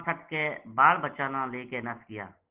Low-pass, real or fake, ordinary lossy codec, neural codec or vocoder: 3.6 kHz; real; Opus, 16 kbps; none